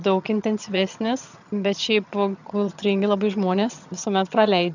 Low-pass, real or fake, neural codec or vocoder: 7.2 kHz; fake; vocoder, 22.05 kHz, 80 mel bands, HiFi-GAN